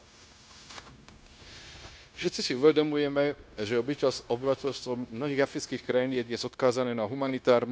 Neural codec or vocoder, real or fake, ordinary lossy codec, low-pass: codec, 16 kHz, 0.9 kbps, LongCat-Audio-Codec; fake; none; none